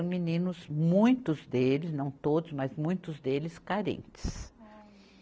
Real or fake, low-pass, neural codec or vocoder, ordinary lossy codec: real; none; none; none